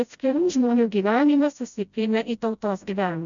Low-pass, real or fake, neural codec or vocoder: 7.2 kHz; fake; codec, 16 kHz, 0.5 kbps, FreqCodec, smaller model